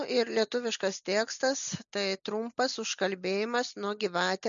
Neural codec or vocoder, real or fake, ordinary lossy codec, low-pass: none; real; MP3, 96 kbps; 7.2 kHz